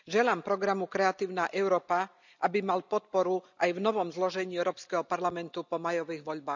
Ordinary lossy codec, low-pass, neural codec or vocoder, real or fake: none; 7.2 kHz; none; real